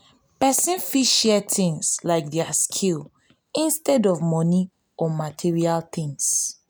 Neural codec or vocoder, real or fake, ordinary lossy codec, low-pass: none; real; none; none